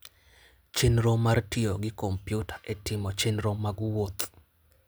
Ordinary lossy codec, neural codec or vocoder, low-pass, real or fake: none; none; none; real